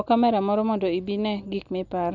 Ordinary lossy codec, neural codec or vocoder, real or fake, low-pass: none; none; real; 7.2 kHz